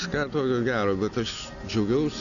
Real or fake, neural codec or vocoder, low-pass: real; none; 7.2 kHz